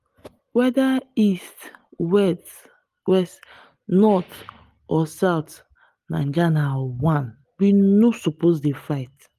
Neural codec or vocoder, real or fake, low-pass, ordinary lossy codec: none; real; 14.4 kHz; Opus, 32 kbps